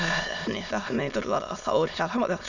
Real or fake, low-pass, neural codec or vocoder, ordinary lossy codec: fake; 7.2 kHz; autoencoder, 22.05 kHz, a latent of 192 numbers a frame, VITS, trained on many speakers; none